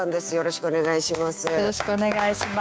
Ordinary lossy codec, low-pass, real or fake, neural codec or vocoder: none; none; fake; codec, 16 kHz, 6 kbps, DAC